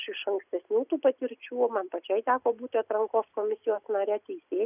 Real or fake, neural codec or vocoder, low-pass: real; none; 3.6 kHz